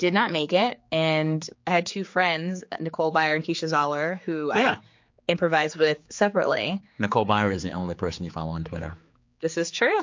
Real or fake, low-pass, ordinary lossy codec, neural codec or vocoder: fake; 7.2 kHz; MP3, 48 kbps; codec, 16 kHz, 4 kbps, X-Codec, HuBERT features, trained on general audio